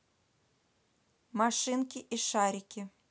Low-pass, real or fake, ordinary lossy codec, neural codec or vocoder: none; real; none; none